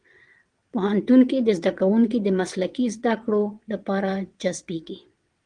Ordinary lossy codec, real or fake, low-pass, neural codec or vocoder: Opus, 24 kbps; fake; 9.9 kHz; vocoder, 22.05 kHz, 80 mel bands, WaveNeXt